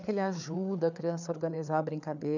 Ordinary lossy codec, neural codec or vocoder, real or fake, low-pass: none; codec, 16 kHz, 4 kbps, FreqCodec, larger model; fake; 7.2 kHz